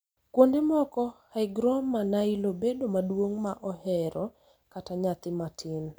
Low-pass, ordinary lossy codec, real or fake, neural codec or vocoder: none; none; real; none